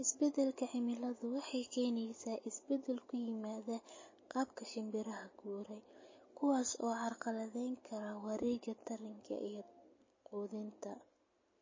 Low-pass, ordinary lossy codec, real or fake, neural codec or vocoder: 7.2 kHz; MP3, 32 kbps; real; none